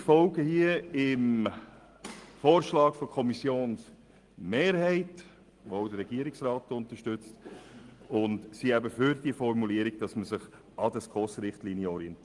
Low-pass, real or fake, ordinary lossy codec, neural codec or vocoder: 10.8 kHz; real; Opus, 24 kbps; none